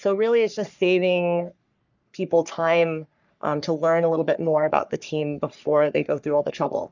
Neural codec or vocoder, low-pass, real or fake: codec, 44.1 kHz, 3.4 kbps, Pupu-Codec; 7.2 kHz; fake